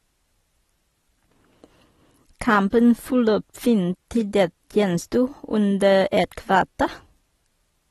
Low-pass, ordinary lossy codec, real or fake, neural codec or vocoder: 19.8 kHz; AAC, 32 kbps; real; none